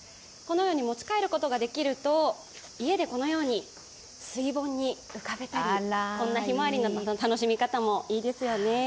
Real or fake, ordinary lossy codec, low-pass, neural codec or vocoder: real; none; none; none